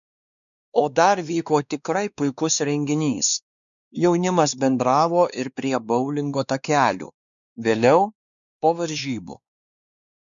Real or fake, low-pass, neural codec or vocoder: fake; 7.2 kHz; codec, 16 kHz, 2 kbps, X-Codec, WavLM features, trained on Multilingual LibriSpeech